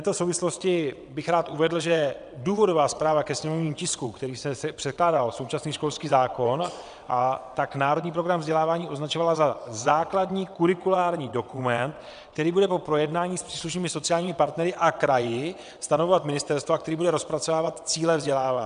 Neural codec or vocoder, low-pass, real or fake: vocoder, 22.05 kHz, 80 mel bands, WaveNeXt; 9.9 kHz; fake